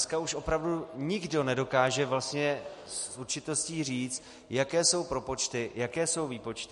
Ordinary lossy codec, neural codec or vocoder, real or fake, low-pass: MP3, 48 kbps; none; real; 14.4 kHz